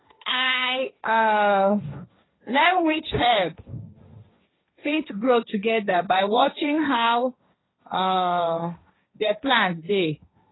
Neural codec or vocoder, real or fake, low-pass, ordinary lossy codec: codec, 16 kHz, 1.1 kbps, Voila-Tokenizer; fake; 7.2 kHz; AAC, 16 kbps